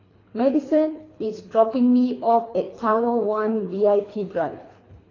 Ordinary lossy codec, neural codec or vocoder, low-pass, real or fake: AAC, 32 kbps; codec, 24 kHz, 3 kbps, HILCodec; 7.2 kHz; fake